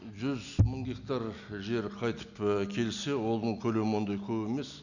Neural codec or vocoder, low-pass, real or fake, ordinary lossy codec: none; 7.2 kHz; real; none